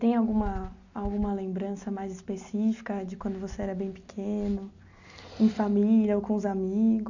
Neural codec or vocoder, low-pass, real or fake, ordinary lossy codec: none; 7.2 kHz; real; none